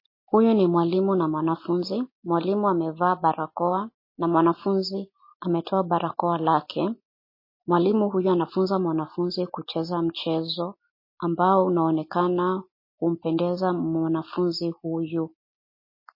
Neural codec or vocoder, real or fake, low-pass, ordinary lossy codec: none; real; 5.4 kHz; MP3, 24 kbps